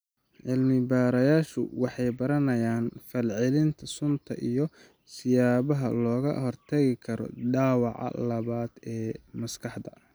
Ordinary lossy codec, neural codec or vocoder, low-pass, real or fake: none; none; none; real